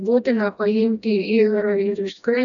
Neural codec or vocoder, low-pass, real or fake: codec, 16 kHz, 1 kbps, FreqCodec, smaller model; 7.2 kHz; fake